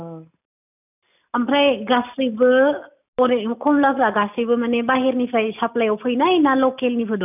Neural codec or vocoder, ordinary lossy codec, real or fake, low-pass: none; none; real; 3.6 kHz